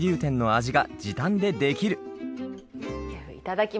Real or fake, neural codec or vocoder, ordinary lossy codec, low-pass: real; none; none; none